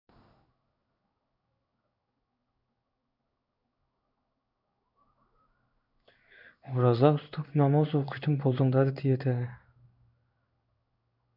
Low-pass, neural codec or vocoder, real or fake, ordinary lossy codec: 5.4 kHz; codec, 16 kHz in and 24 kHz out, 1 kbps, XY-Tokenizer; fake; none